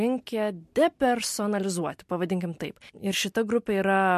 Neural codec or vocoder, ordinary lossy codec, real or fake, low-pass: none; MP3, 64 kbps; real; 14.4 kHz